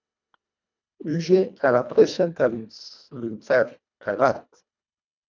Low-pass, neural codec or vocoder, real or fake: 7.2 kHz; codec, 24 kHz, 1.5 kbps, HILCodec; fake